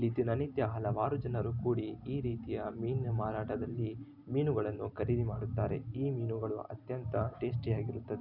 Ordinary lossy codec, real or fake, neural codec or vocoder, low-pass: none; real; none; 5.4 kHz